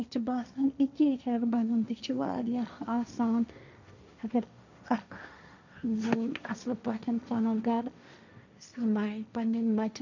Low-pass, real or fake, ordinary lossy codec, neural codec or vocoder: 7.2 kHz; fake; none; codec, 16 kHz, 1.1 kbps, Voila-Tokenizer